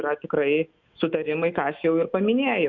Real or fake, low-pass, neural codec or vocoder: fake; 7.2 kHz; autoencoder, 48 kHz, 128 numbers a frame, DAC-VAE, trained on Japanese speech